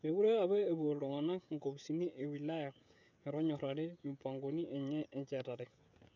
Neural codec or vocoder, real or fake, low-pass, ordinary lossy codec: codec, 16 kHz, 16 kbps, FreqCodec, smaller model; fake; 7.2 kHz; none